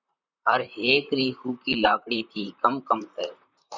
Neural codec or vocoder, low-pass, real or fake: vocoder, 44.1 kHz, 128 mel bands, Pupu-Vocoder; 7.2 kHz; fake